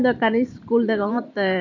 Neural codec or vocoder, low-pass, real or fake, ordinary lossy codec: vocoder, 44.1 kHz, 128 mel bands every 512 samples, BigVGAN v2; 7.2 kHz; fake; AAC, 48 kbps